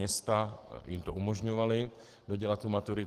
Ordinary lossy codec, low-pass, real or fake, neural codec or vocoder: Opus, 16 kbps; 14.4 kHz; fake; codec, 44.1 kHz, 7.8 kbps, DAC